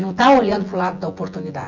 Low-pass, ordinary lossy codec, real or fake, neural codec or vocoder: 7.2 kHz; none; fake; vocoder, 24 kHz, 100 mel bands, Vocos